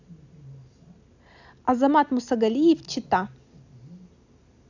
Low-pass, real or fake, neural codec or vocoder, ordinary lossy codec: 7.2 kHz; real; none; none